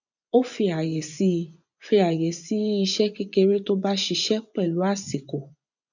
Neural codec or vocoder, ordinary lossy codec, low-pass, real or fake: vocoder, 24 kHz, 100 mel bands, Vocos; none; 7.2 kHz; fake